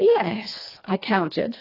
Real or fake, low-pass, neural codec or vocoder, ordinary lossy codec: fake; 5.4 kHz; codec, 24 kHz, 1.5 kbps, HILCodec; AAC, 24 kbps